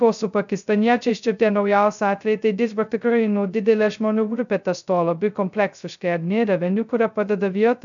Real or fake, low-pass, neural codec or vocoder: fake; 7.2 kHz; codec, 16 kHz, 0.2 kbps, FocalCodec